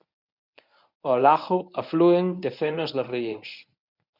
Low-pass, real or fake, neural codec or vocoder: 5.4 kHz; fake; codec, 24 kHz, 0.9 kbps, WavTokenizer, medium speech release version 1